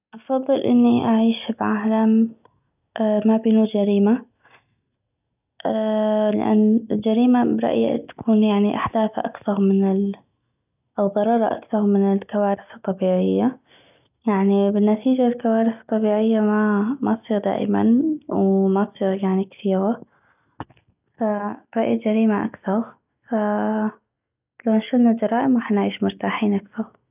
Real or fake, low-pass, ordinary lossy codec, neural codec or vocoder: real; 3.6 kHz; none; none